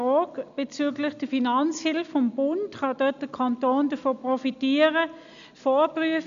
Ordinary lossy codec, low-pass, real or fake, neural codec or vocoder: none; 7.2 kHz; real; none